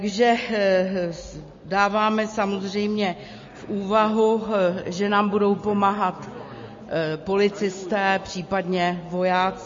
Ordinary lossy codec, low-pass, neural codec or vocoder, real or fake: MP3, 32 kbps; 7.2 kHz; none; real